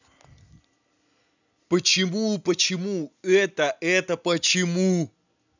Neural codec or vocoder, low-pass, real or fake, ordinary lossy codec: none; 7.2 kHz; real; none